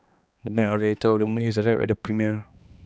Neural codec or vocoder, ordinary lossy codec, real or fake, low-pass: codec, 16 kHz, 2 kbps, X-Codec, HuBERT features, trained on balanced general audio; none; fake; none